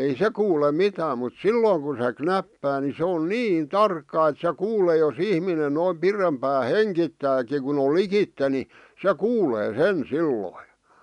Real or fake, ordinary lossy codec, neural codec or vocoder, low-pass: real; none; none; 10.8 kHz